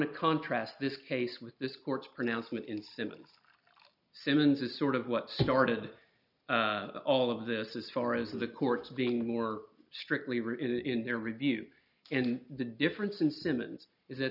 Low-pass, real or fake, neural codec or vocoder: 5.4 kHz; real; none